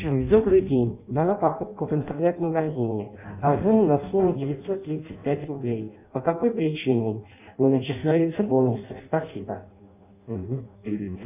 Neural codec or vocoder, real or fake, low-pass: codec, 16 kHz in and 24 kHz out, 0.6 kbps, FireRedTTS-2 codec; fake; 3.6 kHz